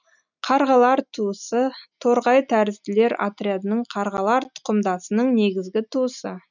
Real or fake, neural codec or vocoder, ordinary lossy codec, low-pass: real; none; none; 7.2 kHz